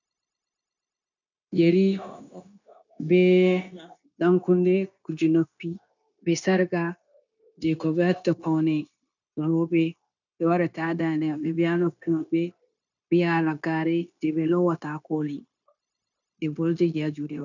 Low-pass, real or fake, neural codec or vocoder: 7.2 kHz; fake; codec, 16 kHz, 0.9 kbps, LongCat-Audio-Codec